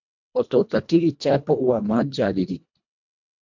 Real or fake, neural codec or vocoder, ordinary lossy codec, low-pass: fake; codec, 24 kHz, 1.5 kbps, HILCodec; MP3, 64 kbps; 7.2 kHz